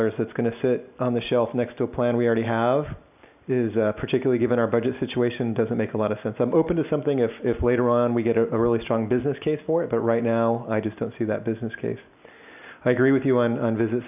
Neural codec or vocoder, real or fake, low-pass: none; real; 3.6 kHz